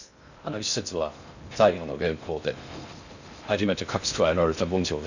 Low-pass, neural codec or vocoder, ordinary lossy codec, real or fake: 7.2 kHz; codec, 16 kHz in and 24 kHz out, 0.6 kbps, FocalCodec, streaming, 2048 codes; none; fake